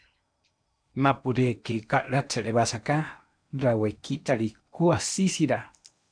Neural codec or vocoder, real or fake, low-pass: codec, 16 kHz in and 24 kHz out, 0.8 kbps, FocalCodec, streaming, 65536 codes; fake; 9.9 kHz